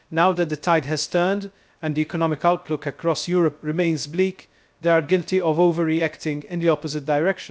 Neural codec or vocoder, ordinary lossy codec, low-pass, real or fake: codec, 16 kHz, 0.3 kbps, FocalCodec; none; none; fake